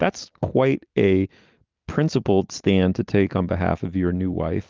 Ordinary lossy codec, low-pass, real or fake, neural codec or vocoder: Opus, 32 kbps; 7.2 kHz; real; none